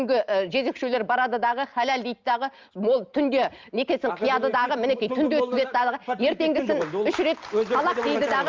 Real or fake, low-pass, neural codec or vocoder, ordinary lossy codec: real; 7.2 kHz; none; Opus, 32 kbps